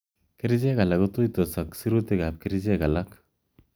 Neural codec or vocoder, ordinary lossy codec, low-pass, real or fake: none; none; none; real